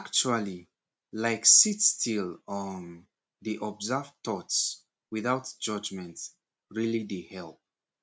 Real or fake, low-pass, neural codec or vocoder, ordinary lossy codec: real; none; none; none